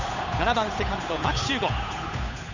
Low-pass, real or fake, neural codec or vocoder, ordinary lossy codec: 7.2 kHz; fake; codec, 16 kHz, 8 kbps, FunCodec, trained on Chinese and English, 25 frames a second; none